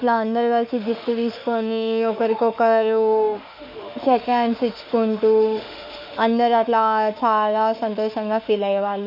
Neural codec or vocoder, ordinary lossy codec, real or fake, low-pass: autoencoder, 48 kHz, 32 numbers a frame, DAC-VAE, trained on Japanese speech; MP3, 48 kbps; fake; 5.4 kHz